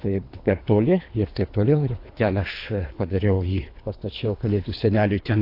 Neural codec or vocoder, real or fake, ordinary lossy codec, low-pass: codec, 24 kHz, 3 kbps, HILCodec; fake; AAC, 32 kbps; 5.4 kHz